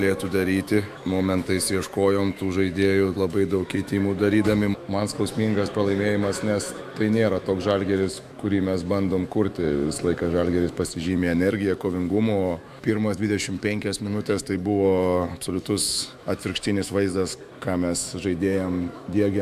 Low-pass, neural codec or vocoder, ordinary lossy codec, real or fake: 14.4 kHz; autoencoder, 48 kHz, 128 numbers a frame, DAC-VAE, trained on Japanese speech; AAC, 96 kbps; fake